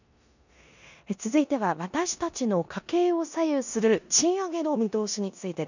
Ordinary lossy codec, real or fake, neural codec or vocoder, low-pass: none; fake; codec, 16 kHz in and 24 kHz out, 0.9 kbps, LongCat-Audio-Codec, four codebook decoder; 7.2 kHz